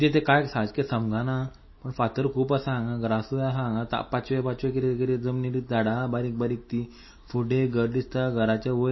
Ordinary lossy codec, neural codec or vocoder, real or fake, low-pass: MP3, 24 kbps; none; real; 7.2 kHz